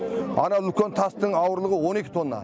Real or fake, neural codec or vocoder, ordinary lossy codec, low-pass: real; none; none; none